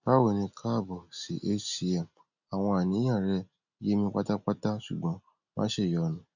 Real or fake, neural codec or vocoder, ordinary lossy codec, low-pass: real; none; none; 7.2 kHz